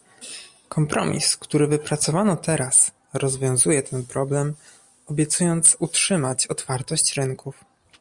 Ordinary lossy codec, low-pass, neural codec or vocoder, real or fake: Opus, 64 kbps; 10.8 kHz; none; real